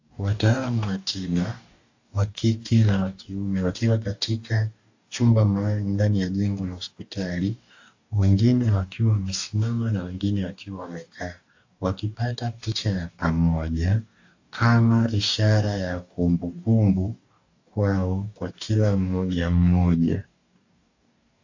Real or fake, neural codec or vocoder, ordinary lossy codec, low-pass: fake; codec, 44.1 kHz, 2.6 kbps, DAC; AAC, 48 kbps; 7.2 kHz